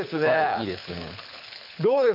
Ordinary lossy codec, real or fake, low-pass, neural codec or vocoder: MP3, 48 kbps; fake; 5.4 kHz; codec, 24 kHz, 6 kbps, HILCodec